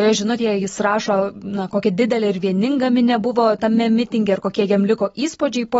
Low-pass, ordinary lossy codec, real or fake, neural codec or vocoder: 19.8 kHz; AAC, 24 kbps; real; none